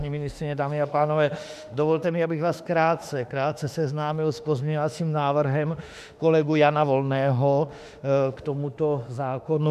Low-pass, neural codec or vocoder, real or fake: 14.4 kHz; autoencoder, 48 kHz, 32 numbers a frame, DAC-VAE, trained on Japanese speech; fake